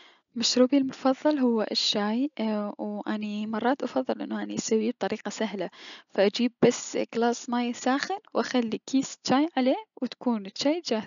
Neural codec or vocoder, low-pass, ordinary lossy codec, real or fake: none; 7.2 kHz; none; real